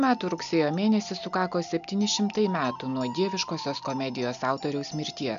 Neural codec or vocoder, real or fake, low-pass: none; real; 7.2 kHz